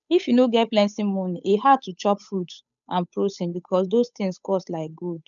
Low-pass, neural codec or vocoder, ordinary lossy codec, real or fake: 7.2 kHz; codec, 16 kHz, 8 kbps, FunCodec, trained on Chinese and English, 25 frames a second; none; fake